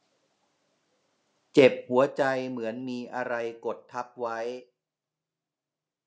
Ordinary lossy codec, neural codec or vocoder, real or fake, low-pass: none; none; real; none